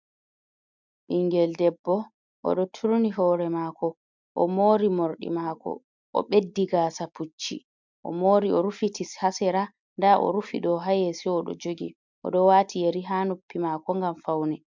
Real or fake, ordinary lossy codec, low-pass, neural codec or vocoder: real; MP3, 64 kbps; 7.2 kHz; none